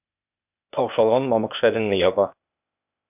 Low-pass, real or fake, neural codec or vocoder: 3.6 kHz; fake; codec, 16 kHz, 0.8 kbps, ZipCodec